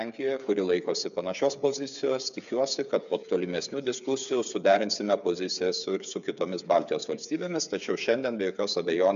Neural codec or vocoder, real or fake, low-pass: codec, 16 kHz, 8 kbps, FreqCodec, smaller model; fake; 7.2 kHz